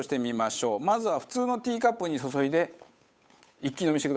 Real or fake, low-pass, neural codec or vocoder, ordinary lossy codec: fake; none; codec, 16 kHz, 8 kbps, FunCodec, trained on Chinese and English, 25 frames a second; none